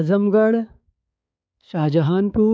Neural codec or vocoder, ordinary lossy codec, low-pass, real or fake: codec, 16 kHz, 4 kbps, X-Codec, HuBERT features, trained on balanced general audio; none; none; fake